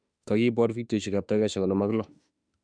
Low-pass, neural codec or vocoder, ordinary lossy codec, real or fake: 9.9 kHz; autoencoder, 48 kHz, 32 numbers a frame, DAC-VAE, trained on Japanese speech; none; fake